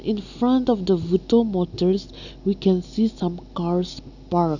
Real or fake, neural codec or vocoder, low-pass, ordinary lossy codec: real; none; 7.2 kHz; none